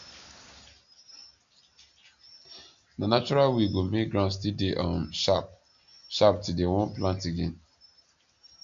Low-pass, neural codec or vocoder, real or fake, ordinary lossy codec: 7.2 kHz; none; real; AAC, 64 kbps